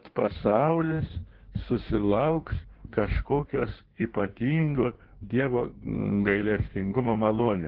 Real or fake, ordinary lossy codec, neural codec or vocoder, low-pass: fake; Opus, 16 kbps; codec, 16 kHz in and 24 kHz out, 1.1 kbps, FireRedTTS-2 codec; 5.4 kHz